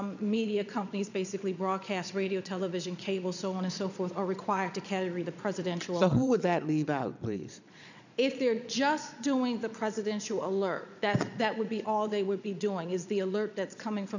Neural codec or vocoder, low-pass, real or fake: vocoder, 22.05 kHz, 80 mel bands, Vocos; 7.2 kHz; fake